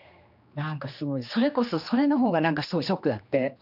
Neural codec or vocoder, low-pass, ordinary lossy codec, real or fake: codec, 16 kHz, 2 kbps, X-Codec, HuBERT features, trained on general audio; 5.4 kHz; none; fake